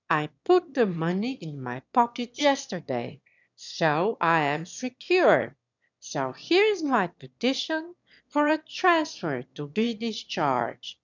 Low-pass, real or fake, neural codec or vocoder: 7.2 kHz; fake; autoencoder, 22.05 kHz, a latent of 192 numbers a frame, VITS, trained on one speaker